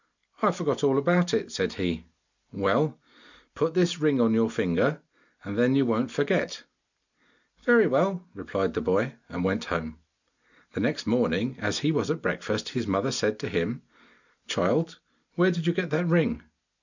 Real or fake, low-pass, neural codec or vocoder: real; 7.2 kHz; none